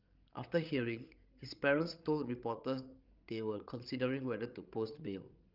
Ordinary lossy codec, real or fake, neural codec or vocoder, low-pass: Opus, 24 kbps; fake; codec, 16 kHz, 16 kbps, FreqCodec, larger model; 5.4 kHz